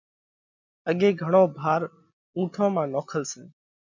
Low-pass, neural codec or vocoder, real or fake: 7.2 kHz; none; real